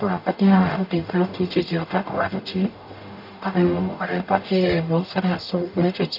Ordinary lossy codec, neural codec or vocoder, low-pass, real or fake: none; codec, 44.1 kHz, 0.9 kbps, DAC; 5.4 kHz; fake